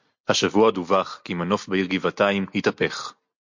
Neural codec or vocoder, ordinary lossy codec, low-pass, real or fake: none; MP3, 48 kbps; 7.2 kHz; real